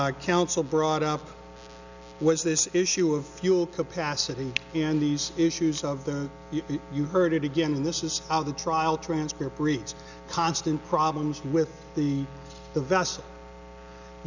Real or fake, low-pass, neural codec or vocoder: real; 7.2 kHz; none